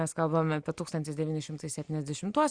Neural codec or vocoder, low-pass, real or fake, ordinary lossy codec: none; 9.9 kHz; real; Opus, 64 kbps